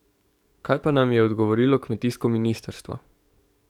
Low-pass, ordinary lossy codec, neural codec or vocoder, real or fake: 19.8 kHz; none; codec, 44.1 kHz, 7.8 kbps, DAC; fake